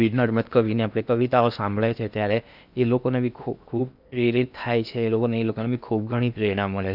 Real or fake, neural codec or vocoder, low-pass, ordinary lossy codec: fake; codec, 16 kHz in and 24 kHz out, 0.8 kbps, FocalCodec, streaming, 65536 codes; 5.4 kHz; none